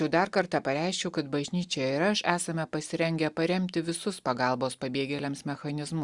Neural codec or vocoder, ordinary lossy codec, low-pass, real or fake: none; Opus, 64 kbps; 10.8 kHz; real